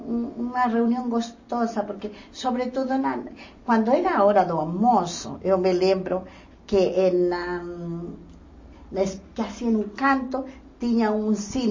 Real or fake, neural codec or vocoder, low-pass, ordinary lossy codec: real; none; 7.2 kHz; MP3, 32 kbps